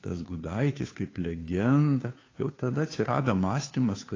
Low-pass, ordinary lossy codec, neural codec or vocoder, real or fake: 7.2 kHz; AAC, 32 kbps; codec, 16 kHz, 2 kbps, FunCodec, trained on Chinese and English, 25 frames a second; fake